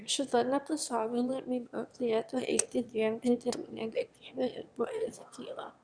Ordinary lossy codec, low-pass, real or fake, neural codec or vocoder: Opus, 64 kbps; 9.9 kHz; fake; autoencoder, 22.05 kHz, a latent of 192 numbers a frame, VITS, trained on one speaker